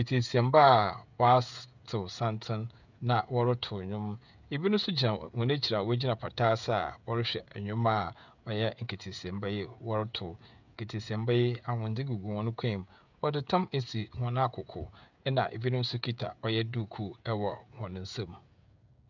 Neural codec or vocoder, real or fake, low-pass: codec, 16 kHz, 16 kbps, FreqCodec, smaller model; fake; 7.2 kHz